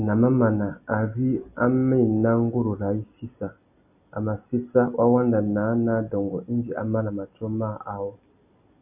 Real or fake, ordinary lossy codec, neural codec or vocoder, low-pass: real; AAC, 32 kbps; none; 3.6 kHz